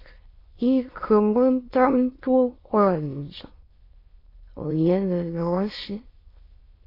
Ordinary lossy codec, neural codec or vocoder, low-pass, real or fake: AAC, 24 kbps; autoencoder, 22.05 kHz, a latent of 192 numbers a frame, VITS, trained on many speakers; 5.4 kHz; fake